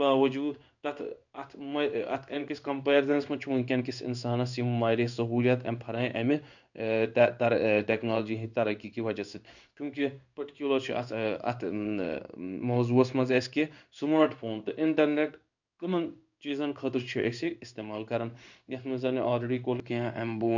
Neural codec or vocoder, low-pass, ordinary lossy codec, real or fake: codec, 16 kHz in and 24 kHz out, 1 kbps, XY-Tokenizer; 7.2 kHz; none; fake